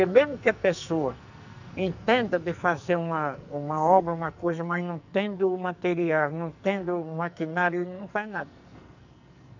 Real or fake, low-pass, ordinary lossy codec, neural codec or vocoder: fake; 7.2 kHz; none; codec, 44.1 kHz, 2.6 kbps, SNAC